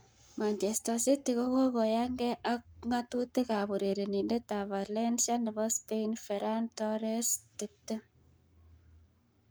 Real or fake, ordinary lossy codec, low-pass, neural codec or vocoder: fake; none; none; codec, 44.1 kHz, 7.8 kbps, Pupu-Codec